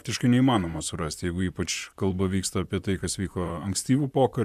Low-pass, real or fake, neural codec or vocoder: 14.4 kHz; fake; vocoder, 44.1 kHz, 128 mel bands, Pupu-Vocoder